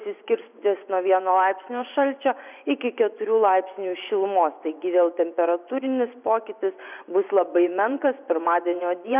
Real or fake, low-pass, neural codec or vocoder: fake; 3.6 kHz; vocoder, 24 kHz, 100 mel bands, Vocos